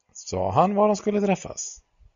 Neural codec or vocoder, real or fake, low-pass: none; real; 7.2 kHz